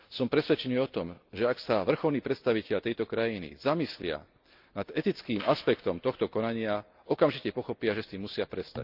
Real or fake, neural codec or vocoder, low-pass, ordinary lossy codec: real; none; 5.4 kHz; Opus, 24 kbps